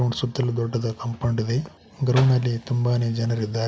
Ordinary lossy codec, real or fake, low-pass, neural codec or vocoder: none; real; none; none